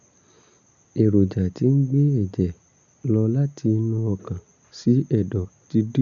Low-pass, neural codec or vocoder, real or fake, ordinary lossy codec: 7.2 kHz; none; real; none